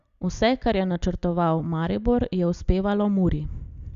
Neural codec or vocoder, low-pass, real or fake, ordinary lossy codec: none; 7.2 kHz; real; Opus, 64 kbps